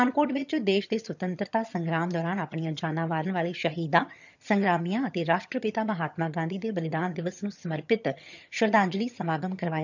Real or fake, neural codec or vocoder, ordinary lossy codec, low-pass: fake; vocoder, 22.05 kHz, 80 mel bands, HiFi-GAN; none; 7.2 kHz